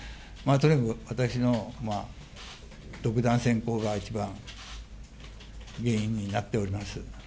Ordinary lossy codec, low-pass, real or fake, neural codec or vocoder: none; none; real; none